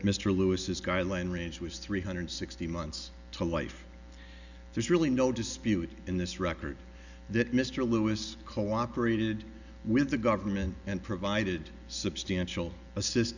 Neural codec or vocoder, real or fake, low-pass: none; real; 7.2 kHz